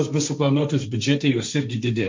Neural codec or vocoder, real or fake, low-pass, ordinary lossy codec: codec, 16 kHz, 1.1 kbps, Voila-Tokenizer; fake; 7.2 kHz; MP3, 48 kbps